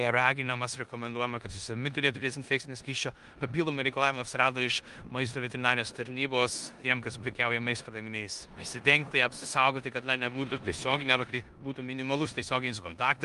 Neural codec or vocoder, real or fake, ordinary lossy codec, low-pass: codec, 16 kHz in and 24 kHz out, 0.9 kbps, LongCat-Audio-Codec, four codebook decoder; fake; Opus, 32 kbps; 10.8 kHz